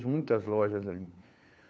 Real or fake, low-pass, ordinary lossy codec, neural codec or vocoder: fake; none; none; codec, 16 kHz, 4 kbps, FunCodec, trained on LibriTTS, 50 frames a second